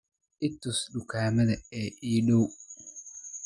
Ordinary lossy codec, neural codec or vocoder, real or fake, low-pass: none; none; real; 10.8 kHz